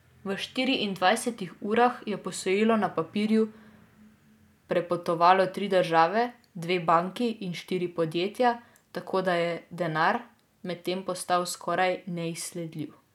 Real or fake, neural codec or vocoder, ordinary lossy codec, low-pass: real; none; none; 19.8 kHz